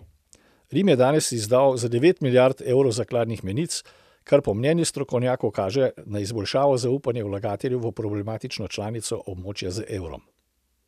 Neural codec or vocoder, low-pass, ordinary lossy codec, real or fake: none; 14.4 kHz; none; real